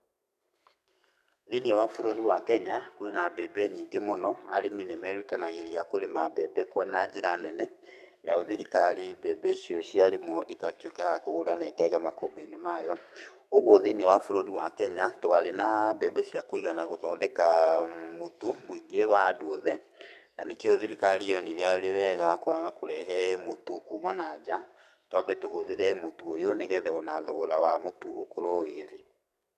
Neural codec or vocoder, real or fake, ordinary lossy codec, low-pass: codec, 32 kHz, 1.9 kbps, SNAC; fake; none; 14.4 kHz